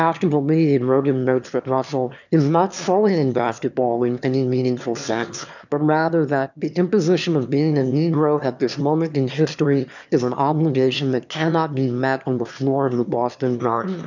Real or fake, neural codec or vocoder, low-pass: fake; autoencoder, 22.05 kHz, a latent of 192 numbers a frame, VITS, trained on one speaker; 7.2 kHz